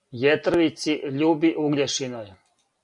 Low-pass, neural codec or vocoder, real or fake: 10.8 kHz; none; real